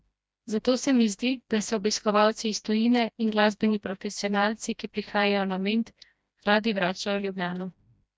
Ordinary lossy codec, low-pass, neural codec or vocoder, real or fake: none; none; codec, 16 kHz, 1 kbps, FreqCodec, smaller model; fake